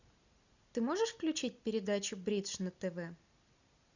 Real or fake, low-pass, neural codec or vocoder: real; 7.2 kHz; none